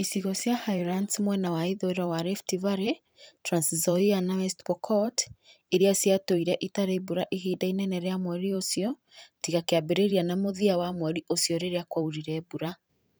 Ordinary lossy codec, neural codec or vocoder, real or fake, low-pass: none; vocoder, 44.1 kHz, 128 mel bands every 512 samples, BigVGAN v2; fake; none